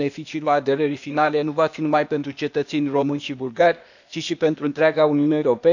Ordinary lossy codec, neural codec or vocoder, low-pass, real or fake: none; codec, 16 kHz, 0.8 kbps, ZipCodec; 7.2 kHz; fake